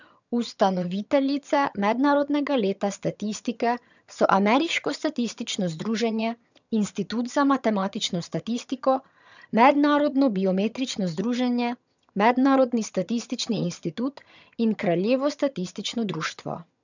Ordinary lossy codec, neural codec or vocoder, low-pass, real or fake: none; vocoder, 22.05 kHz, 80 mel bands, HiFi-GAN; 7.2 kHz; fake